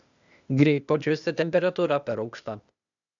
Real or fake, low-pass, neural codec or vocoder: fake; 7.2 kHz; codec, 16 kHz, 0.8 kbps, ZipCodec